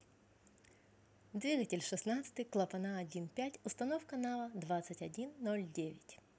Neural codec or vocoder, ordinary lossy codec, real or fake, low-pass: none; none; real; none